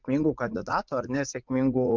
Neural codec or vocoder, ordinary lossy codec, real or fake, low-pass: vocoder, 44.1 kHz, 80 mel bands, Vocos; MP3, 64 kbps; fake; 7.2 kHz